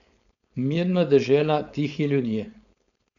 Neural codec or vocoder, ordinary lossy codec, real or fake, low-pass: codec, 16 kHz, 4.8 kbps, FACodec; none; fake; 7.2 kHz